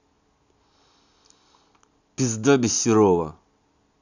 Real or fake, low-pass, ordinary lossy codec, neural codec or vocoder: real; 7.2 kHz; none; none